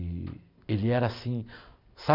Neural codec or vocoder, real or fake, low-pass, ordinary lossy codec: none; real; 5.4 kHz; none